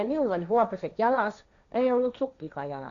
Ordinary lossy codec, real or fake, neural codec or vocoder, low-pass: none; fake; codec, 16 kHz, 1.1 kbps, Voila-Tokenizer; 7.2 kHz